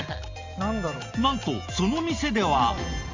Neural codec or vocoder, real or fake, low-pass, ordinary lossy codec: none; real; 7.2 kHz; Opus, 32 kbps